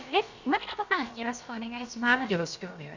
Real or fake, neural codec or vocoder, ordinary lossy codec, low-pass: fake; codec, 16 kHz, about 1 kbps, DyCAST, with the encoder's durations; Opus, 64 kbps; 7.2 kHz